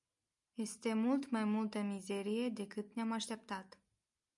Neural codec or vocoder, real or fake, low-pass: none; real; 10.8 kHz